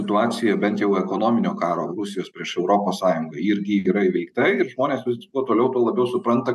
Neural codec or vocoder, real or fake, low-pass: none; real; 14.4 kHz